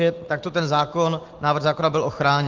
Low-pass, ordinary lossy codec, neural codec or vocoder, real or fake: 7.2 kHz; Opus, 16 kbps; none; real